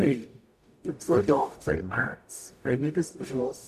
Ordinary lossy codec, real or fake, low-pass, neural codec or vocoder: none; fake; 14.4 kHz; codec, 44.1 kHz, 0.9 kbps, DAC